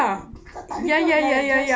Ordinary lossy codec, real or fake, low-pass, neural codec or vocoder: none; real; none; none